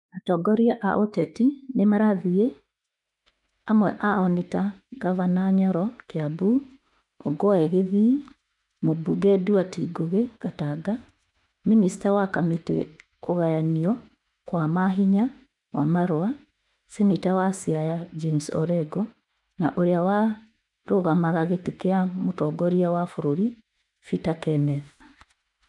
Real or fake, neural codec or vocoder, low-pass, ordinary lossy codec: fake; autoencoder, 48 kHz, 32 numbers a frame, DAC-VAE, trained on Japanese speech; 10.8 kHz; none